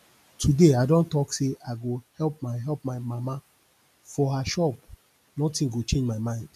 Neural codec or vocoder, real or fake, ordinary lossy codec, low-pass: none; real; none; 14.4 kHz